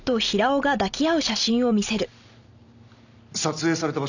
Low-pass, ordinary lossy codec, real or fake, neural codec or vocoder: 7.2 kHz; none; real; none